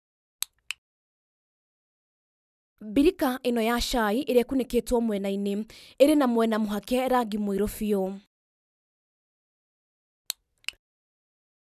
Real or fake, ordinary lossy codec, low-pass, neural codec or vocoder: real; none; 14.4 kHz; none